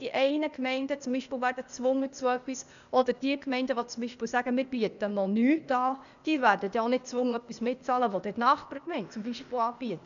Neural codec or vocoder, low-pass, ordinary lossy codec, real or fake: codec, 16 kHz, 0.8 kbps, ZipCodec; 7.2 kHz; none; fake